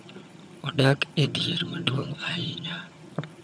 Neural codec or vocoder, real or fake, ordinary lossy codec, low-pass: vocoder, 22.05 kHz, 80 mel bands, HiFi-GAN; fake; none; none